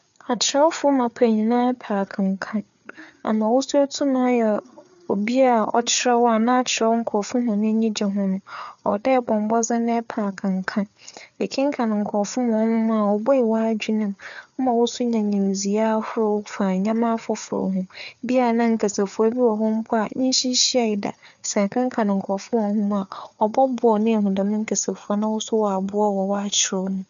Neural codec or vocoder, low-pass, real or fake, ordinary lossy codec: codec, 16 kHz, 4 kbps, FreqCodec, larger model; 7.2 kHz; fake; none